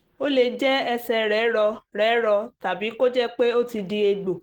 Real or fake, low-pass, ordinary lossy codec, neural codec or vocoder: real; 19.8 kHz; Opus, 16 kbps; none